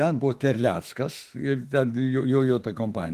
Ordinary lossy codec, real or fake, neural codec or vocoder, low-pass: Opus, 24 kbps; fake; autoencoder, 48 kHz, 32 numbers a frame, DAC-VAE, trained on Japanese speech; 14.4 kHz